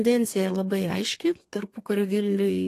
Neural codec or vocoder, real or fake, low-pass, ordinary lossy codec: codec, 32 kHz, 1.9 kbps, SNAC; fake; 14.4 kHz; AAC, 48 kbps